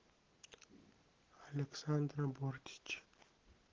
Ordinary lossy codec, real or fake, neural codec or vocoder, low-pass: Opus, 16 kbps; real; none; 7.2 kHz